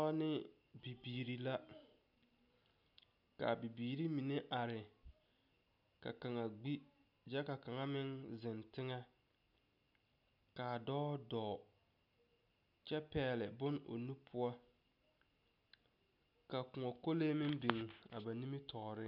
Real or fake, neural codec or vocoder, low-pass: real; none; 5.4 kHz